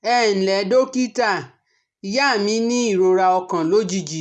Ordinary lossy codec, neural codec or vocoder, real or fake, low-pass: none; none; real; none